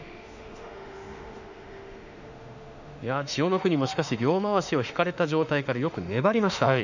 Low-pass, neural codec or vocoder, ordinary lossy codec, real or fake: 7.2 kHz; autoencoder, 48 kHz, 32 numbers a frame, DAC-VAE, trained on Japanese speech; none; fake